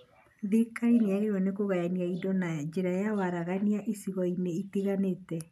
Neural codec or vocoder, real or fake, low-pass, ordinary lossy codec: none; real; 14.4 kHz; none